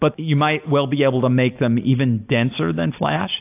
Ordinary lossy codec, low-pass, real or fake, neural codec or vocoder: AAC, 32 kbps; 3.6 kHz; real; none